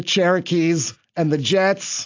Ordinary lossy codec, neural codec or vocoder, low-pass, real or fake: AAC, 48 kbps; none; 7.2 kHz; real